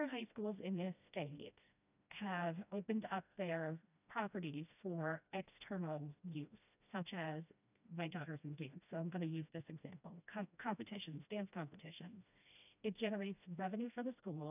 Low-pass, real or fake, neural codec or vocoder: 3.6 kHz; fake; codec, 16 kHz, 1 kbps, FreqCodec, smaller model